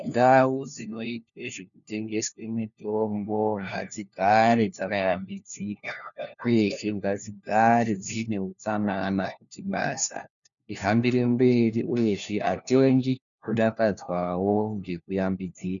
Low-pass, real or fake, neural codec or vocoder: 7.2 kHz; fake; codec, 16 kHz, 1 kbps, FunCodec, trained on LibriTTS, 50 frames a second